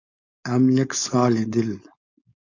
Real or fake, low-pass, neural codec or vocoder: fake; 7.2 kHz; codec, 16 kHz, 4.8 kbps, FACodec